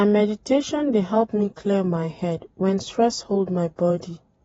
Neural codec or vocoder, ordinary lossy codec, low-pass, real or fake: vocoder, 48 kHz, 128 mel bands, Vocos; AAC, 24 kbps; 19.8 kHz; fake